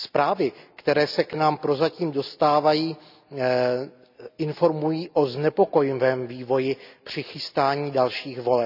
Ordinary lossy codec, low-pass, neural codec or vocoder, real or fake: none; 5.4 kHz; none; real